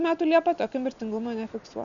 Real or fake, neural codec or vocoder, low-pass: real; none; 7.2 kHz